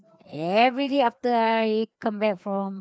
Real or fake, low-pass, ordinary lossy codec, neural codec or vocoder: fake; none; none; codec, 16 kHz, 2 kbps, FreqCodec, larger model